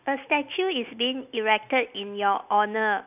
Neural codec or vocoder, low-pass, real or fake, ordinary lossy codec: none; 3.6 kHz; real; none